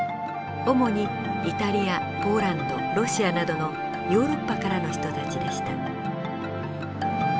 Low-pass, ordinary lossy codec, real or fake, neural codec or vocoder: none; none; real; none